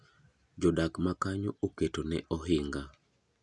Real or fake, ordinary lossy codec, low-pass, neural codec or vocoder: real; none; 10.8 kHz; none